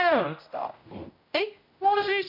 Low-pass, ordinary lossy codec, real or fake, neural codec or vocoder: 5.4 kHz; MP3, 48 kbps; fake; codec, 16 kHz, 0.5 kbps, X-Codec, HuBERT features, trained on general audio